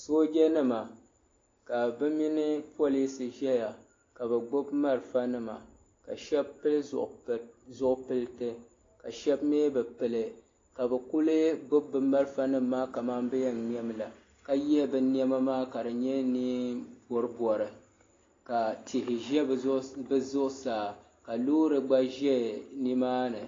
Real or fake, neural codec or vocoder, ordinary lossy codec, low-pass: real; none; AAC, 32 kbps; 7.2 kHz